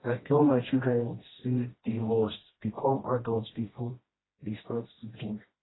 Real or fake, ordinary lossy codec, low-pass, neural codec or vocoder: fake; AAC, 16 kbps; 7.2 kHz; codec, 16 kHz, 1 kbps, FreqCodec, smaller model